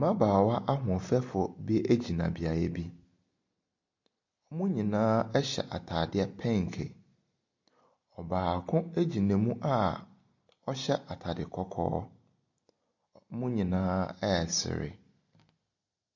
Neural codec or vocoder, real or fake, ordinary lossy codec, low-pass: none; real; MP3, 48 kbps; 7.2 kHz